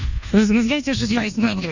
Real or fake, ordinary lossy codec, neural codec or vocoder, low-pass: fake; none; codec, 24 kHz, 1.2 kbps, DualCodec; 7.2 kHz